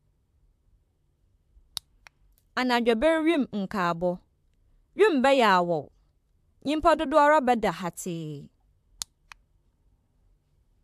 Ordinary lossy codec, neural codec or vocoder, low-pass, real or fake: AAC, 96 kbps; vocoder, 44.1 kHz, 128 mel bands, Pupu-Vocoder; 14.4 kHz; fake